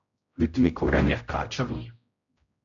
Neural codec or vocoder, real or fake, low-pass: codec, 16 kHz, 0.5 kbps, X-Codec, HuBERT features, trained on general audio; fake; 7.2 kHz